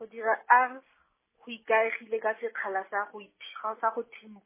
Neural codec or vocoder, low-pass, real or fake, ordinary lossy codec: none; 3.6 kHz; real; MP3, 16 kbps